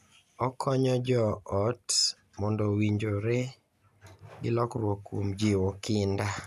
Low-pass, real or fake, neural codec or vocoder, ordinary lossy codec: 14.4 kHz; real; none; none